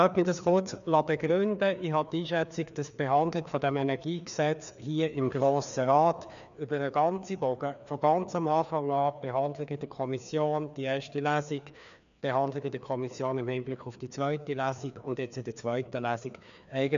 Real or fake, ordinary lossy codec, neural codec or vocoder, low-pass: fake; none; codec, 16 kHz, 2 kbps, FreqCodec, larger model; 7.2 kHz